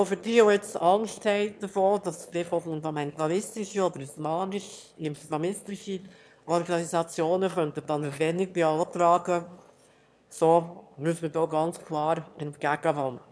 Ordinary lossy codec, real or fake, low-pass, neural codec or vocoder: none; fake; none; autoencoder, 22.05 kHz, a latent of 192 numbers a frame, VITS, trained on one speaker